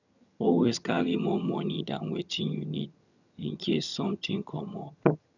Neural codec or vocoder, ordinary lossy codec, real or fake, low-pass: vocoder, 22.05 kHz, 80 mel bands, HiFi-GAN; none; fake; 7.2 kHz